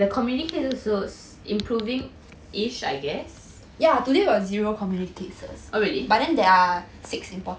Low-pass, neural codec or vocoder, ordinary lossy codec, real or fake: none; none; none; real